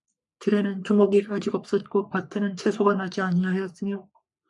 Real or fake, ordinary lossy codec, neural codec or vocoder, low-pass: fake; MP3, 96 kbps; codec, 44.1 kHz, 3.4 kbps, Pupu-Codec; 10.8 kHz